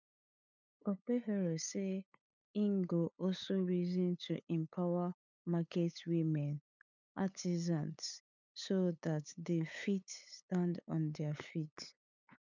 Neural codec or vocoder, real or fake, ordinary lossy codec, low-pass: codec, 16 kHz, 8 kbps, FunCodec, trained on LibriTTS, 25 frames a second; fake; none; 7.2 kHz